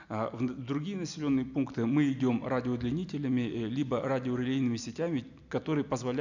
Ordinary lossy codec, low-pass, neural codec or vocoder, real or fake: none; 7.2 kHz; none; real